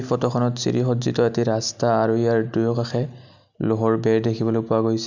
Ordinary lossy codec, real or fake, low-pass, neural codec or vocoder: none; real; 7.2 kHz; none